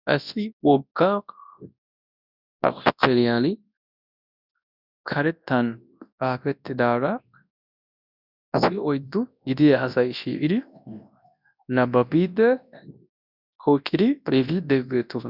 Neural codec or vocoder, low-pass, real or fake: codec, 24 kHz, 0.9 kbps, WavTokenizer, large speech release; 5.4 kHz; fake